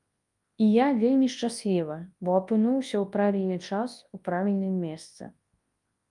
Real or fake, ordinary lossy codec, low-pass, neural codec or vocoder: fake; Opus, 32 kbps; 10.8 kHz; codec, 24 kHz, 0.9 kbps, WavTokenizer, large speech release